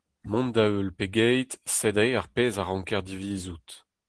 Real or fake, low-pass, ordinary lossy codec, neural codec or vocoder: real; 10.8 kHz; Opus, 16 kbps; none